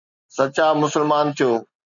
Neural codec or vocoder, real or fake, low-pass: none; real; 7.2 kHz